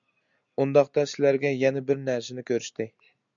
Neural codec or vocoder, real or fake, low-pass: none; real; 7.2 kHz